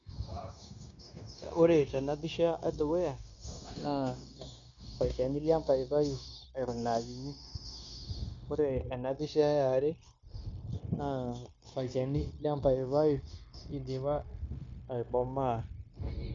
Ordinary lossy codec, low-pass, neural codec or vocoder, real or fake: MP3, 64 kbps; 7.2 kHz; codec, 16 kHz, 0.9 kbps, LongCat-Audio-Codec; fake